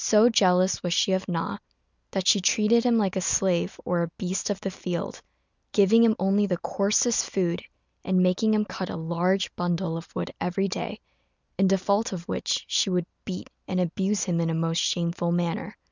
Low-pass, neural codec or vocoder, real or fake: 7.2 kHz; none; real